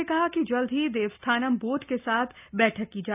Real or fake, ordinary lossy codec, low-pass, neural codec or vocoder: real; none; 3.6 kHz; none